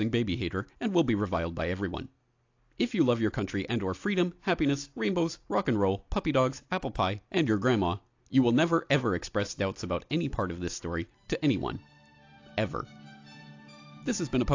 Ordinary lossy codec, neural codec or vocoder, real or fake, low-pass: AAC, 48 kbps; none; real; 7.2 kHz